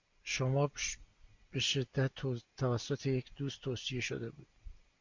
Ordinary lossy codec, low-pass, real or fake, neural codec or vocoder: MP3, 64 kbps; 7.2 kHz; real; none